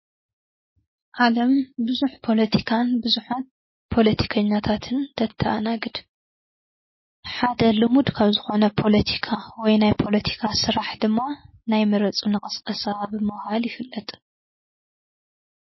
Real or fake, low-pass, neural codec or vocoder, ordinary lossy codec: real; 7.2 kHz; none; MP3, 24 kbps